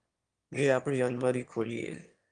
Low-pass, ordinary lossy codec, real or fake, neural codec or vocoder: 9.9 kHz; Opus, 32 kbps; fake; autoencoder, 22.05 kHz, a latent of 192 numbers a frame, VITS, trained on one speaker